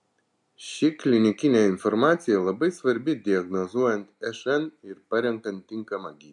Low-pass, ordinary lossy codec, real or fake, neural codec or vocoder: 10.8 kHz; MP3, 64 kbps; real; none